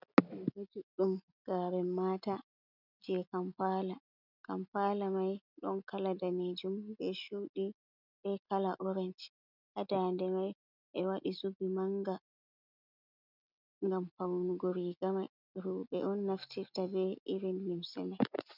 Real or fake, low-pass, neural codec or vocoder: real; 5.4 kHz; none